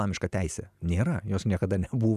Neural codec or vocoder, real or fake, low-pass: none; real; 14.4 kHz